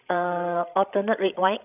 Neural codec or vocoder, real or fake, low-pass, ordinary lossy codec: codec, 16 kHz, 16 kbps, FreqCodec, larger model; fake; 3.6 kHz; none